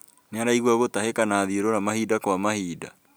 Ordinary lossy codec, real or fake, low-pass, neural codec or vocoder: none; real; none; none